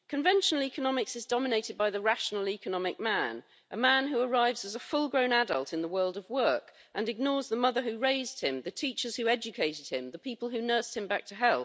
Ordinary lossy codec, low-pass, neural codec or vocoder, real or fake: none; none; none; real